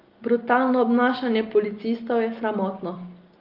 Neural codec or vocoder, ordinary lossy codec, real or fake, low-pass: none; Opus, 32 kbps; real; 5.4 kHz